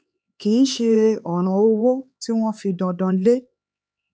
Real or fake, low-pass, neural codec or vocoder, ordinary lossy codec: fake; none; codec, 16 kHz, 4 kbps, X-Codec, HuBERT features, trained on LibriSpeech; none